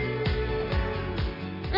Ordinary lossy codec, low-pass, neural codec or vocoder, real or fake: none; 5.4 kHz; none; real